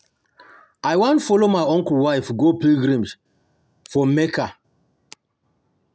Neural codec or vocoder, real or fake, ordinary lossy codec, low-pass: none; real; none; none